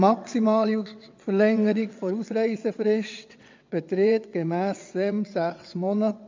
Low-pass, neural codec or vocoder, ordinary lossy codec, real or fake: 7.2 kHz; vocoder, 22.05 kHz, 80 mel bands, WaveNeXt; MP3, 64 kbps; fake